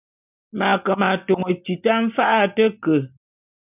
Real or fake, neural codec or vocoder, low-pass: real; none; 3.6 kHz